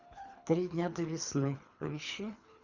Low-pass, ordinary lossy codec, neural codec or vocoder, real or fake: 7.2 kHz; Opus, 64 kbps; codec, 24 kHz, 3 kbps, HILCodec; fake